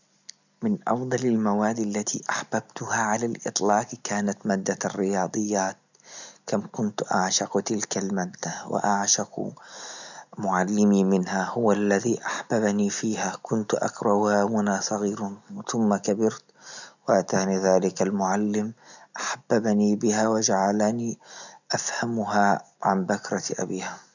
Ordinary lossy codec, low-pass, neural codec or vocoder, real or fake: none; 7.2 kHz; none; real